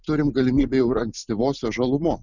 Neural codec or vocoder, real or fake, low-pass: none; real; 7.2 kHz